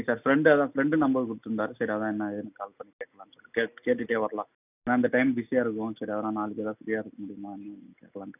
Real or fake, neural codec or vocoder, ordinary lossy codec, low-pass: real; none; none; 3.6 kHz